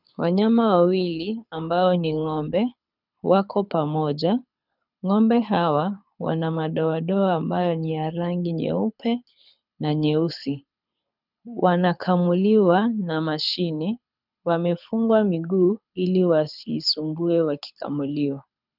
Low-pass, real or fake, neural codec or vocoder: 5.4 kHz; fake; codec, 24 kHz, 6 kbps, HILCodec